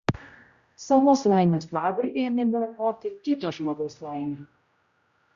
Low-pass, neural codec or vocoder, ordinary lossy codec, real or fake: 7.2 kHz; codec, 16 kHz, 0.5 kbps, X-Codec, HuBERT features, trained on general audio; Opus, 64 kbps; fake